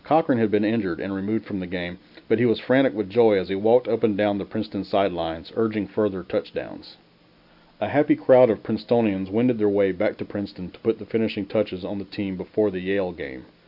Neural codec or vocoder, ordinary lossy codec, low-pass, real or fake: none; AAC, 48 kbps; 5.4 kHz; real